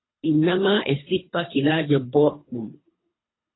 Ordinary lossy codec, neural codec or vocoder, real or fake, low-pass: AAC, 16 kbps; codec, 24 kHz, 3 kbps, HILCodec; fake; 7.2 kHz